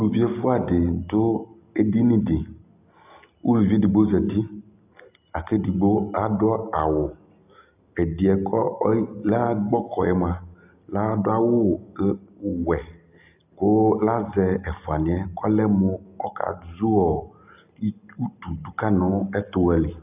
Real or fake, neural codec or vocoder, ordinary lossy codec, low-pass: real; none; AAC, 32 kbps; 3.6 kHz